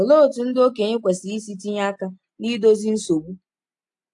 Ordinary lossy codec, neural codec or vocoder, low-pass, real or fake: AAC, 64 kbps; none; 10.8 kHz; real